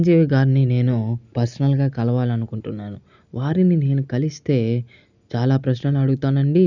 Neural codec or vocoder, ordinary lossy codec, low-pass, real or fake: none; none; 7.2 kHz; real